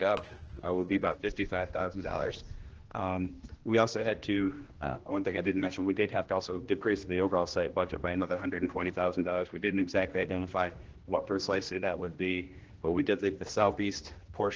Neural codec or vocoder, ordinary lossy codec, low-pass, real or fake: codec, 16 kHz, 1 kbps, X-Codec, HuBERT features, trained on general audio; Opus, 16 kbps; 7.2 kHz; fake